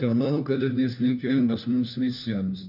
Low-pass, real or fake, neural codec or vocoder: 5.4 kHz; fake; codec, 16 kHz, 1 kbps, FunCodec, trained on LibriTTS, 50 frames a second